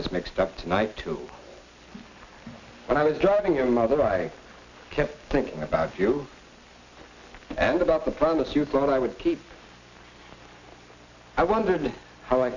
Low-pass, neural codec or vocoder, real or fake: 7.2 kHz; none; real